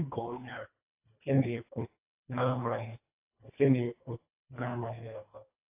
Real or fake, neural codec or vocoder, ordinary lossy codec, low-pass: fake; codec, 24 kHz, 1.5 kbps, HILCodec; AAC, 32 kbps; 3.6 kHz